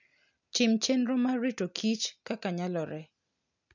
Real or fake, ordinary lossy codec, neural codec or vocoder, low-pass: real; none; none; 7.2 kHz